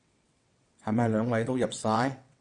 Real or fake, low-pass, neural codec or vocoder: fake; 9.9 kHz; vocoder, 22.05 kHz, 80 mel bands, WaveNeXt